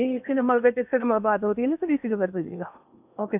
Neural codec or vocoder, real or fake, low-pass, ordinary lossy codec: codec, 16 kHz in and 24 kHz out, 0.8 kbps, FocalCodec, streaming, 65536 codes; fake; 3.6 kHz; none